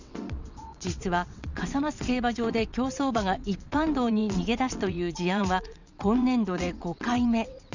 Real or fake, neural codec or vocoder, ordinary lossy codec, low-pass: fake; vocoder, 22.05 kHz, 80 mel bands, WaveNeXt; none; 7.2 kHz